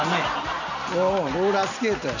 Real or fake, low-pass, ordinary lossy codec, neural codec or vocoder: real; 7.2 kHz; none; none